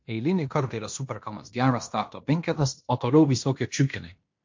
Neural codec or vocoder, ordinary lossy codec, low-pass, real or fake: codec, 16 kHz in and 24 kHz out, 0.9 kbps, LongCat-Audio-Codec, fine tuned four codebook decoder; MP3, 48 kbps; 7.2 kHz; fake